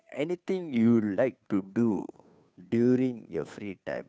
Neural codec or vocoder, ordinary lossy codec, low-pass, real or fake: codec, 16 kHz, 2 kbps, FunCodec, trained on Chinese and English, 25 frames a second; none; none; fake